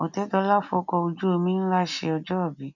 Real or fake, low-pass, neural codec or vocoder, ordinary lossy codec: real; 7.2 kHz; none; none